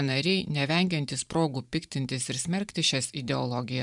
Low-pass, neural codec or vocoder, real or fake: 10.8 kHz; vocoder, 44.1 kHz, 128 mel bands every 512 samples, BigVGAN v2; fake